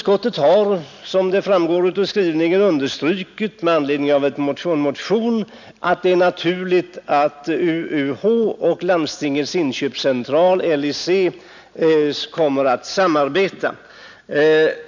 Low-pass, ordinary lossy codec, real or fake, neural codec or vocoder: 7.2 kHz; none; real; none